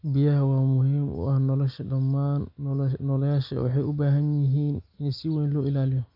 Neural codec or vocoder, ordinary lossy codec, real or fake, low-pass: none; none; real; 5.4 kHz